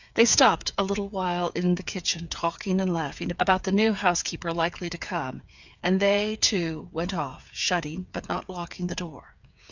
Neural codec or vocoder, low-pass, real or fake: codec, 16 kHz, 8 kbps, FreqCodec, smaller model; 7.2 kHz; fake